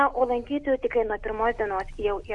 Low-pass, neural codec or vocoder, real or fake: 10.8 kHz; none; real